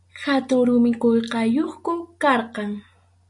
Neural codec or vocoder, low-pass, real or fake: none; 10.8 kHz; real